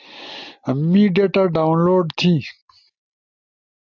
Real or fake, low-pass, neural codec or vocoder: real; 7.2 kHz; none